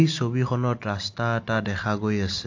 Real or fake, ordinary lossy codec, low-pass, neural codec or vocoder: real; AAC, 48 kbps; 7.2 kHz; none